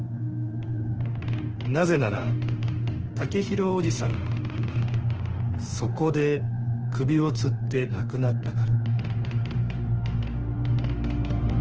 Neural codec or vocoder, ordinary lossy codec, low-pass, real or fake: autoencoder, 48 kHz, 32 numbers a frame, DAC-VAE, trained on Japanese speech; Opus, 16 kbps; 7.2 kHz; fake